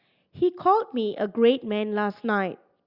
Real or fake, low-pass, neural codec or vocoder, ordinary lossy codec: real; 5.4 kHz; none; Opus, 64 kbps